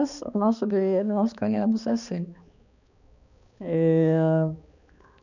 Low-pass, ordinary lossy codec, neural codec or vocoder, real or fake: 7.2 kHz; none; codec, 16 kHz, 2 kbps, X-Codec, HuBERT features, trained on balanced general audio; fake